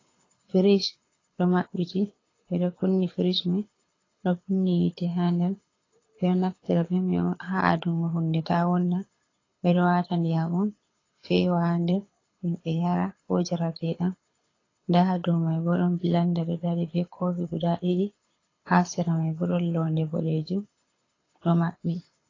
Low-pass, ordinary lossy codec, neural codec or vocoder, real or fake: 7.2 kHz; AAC, 32 kbps; codec, 24 kHz, 6 kbps, HILCodec; fake